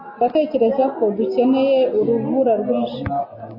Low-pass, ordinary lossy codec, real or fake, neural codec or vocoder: 5.4 kHz; MP3, 24 kbps; real; none